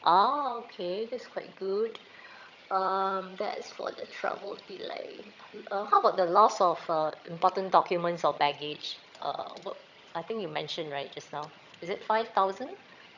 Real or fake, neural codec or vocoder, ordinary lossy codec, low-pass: fake; vocoder, 22.05 kHz, 80 mel bands, HiFi-GAN; none; 7.2 kHz